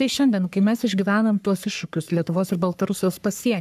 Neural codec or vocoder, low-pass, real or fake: codec, 44.1 kHz, 3.4 kbps, Pupu-Codec; 14.4 kHz; fake